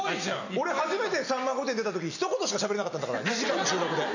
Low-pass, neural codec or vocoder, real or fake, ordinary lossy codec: 7.2 kHz; none; real; none